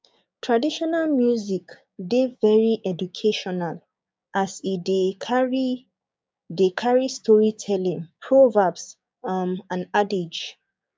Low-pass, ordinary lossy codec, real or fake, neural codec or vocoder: none; none; fake; codec, 16 kHz, 6 kbps, DAC